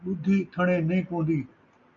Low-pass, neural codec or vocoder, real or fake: 7.2 kHz; none; real